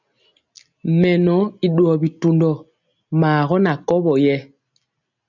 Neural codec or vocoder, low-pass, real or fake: none; 7.2 kHz; real